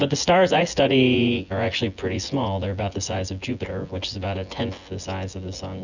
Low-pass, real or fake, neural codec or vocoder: 7.2 kHz; fake; vocoder, 24 kHz, 100 mel bands, Vocos